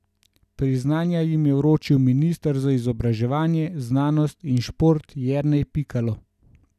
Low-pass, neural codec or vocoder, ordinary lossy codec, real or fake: 14.4 kHz; none; none; real